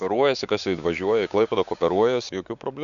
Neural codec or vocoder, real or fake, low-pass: codec, 16 kHz, 6 kbps, DAC; fake; 7.2 kHz